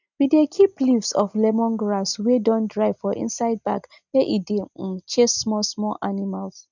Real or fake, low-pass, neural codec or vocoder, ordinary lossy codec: real; 7.2 kHz; none; none